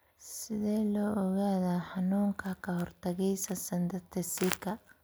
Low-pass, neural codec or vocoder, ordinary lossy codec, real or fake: none; none; none; real